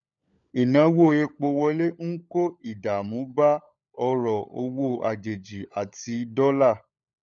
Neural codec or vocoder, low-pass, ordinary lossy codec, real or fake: codec, 16 kHz, 16 kbps, FunCodec, trained on LibriTTS, 50 frames a second; 7.2 kHz; none; fake